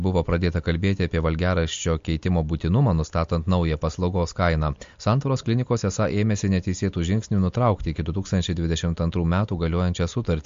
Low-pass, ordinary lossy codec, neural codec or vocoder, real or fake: 7.2 kHz; MP3, 48 kbps; none; real